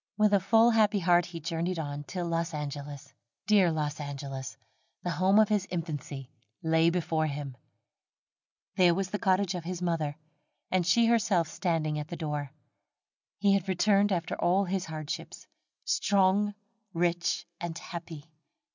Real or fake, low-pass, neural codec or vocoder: real; 7.2 kHz; none